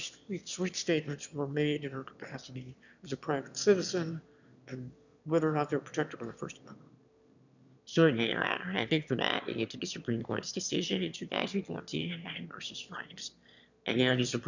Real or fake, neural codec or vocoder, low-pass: fake; autoencoder, 22.05 kHz, a latent of 192 numbers a frame, VITS, trained on one speaker; 7.2 kHz